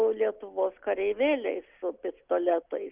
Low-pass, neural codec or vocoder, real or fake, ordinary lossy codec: 3.6 kHz; none; real; Opus, 32 kbps